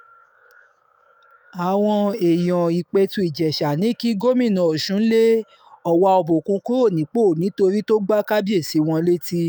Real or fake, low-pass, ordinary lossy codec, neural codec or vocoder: fake; none; none; autoencoder, 48 kHz, 128 numbers a frame, DAC-VAE, trained on Japanese speech